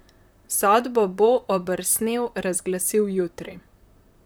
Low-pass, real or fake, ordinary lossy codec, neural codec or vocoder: none; fake; none; vocoder, 44.1 kHz, 128 mel bands, Pupu-Vocoder